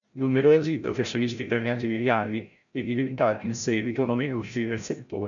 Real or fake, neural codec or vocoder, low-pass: fake; codec, 16 kHz, 0.5 kbps, FreqCodec, larger model; 7.2 kHz